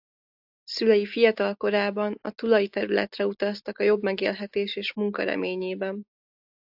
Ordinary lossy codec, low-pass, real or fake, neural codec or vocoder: AAC, 48 kbps; 5.4 kHz; real; none